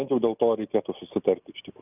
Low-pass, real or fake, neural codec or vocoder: 3.6 kHz; real; none